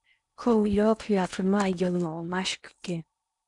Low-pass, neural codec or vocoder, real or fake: 10.8 kHz; codec, 16 kHz in and 24 kHz out, 0.8 kbps, FocalCodec, streaming, 65536 codes; fake